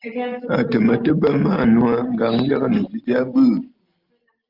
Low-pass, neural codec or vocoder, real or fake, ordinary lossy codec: 5.4 kHz; none; real; Opus, 24 kbps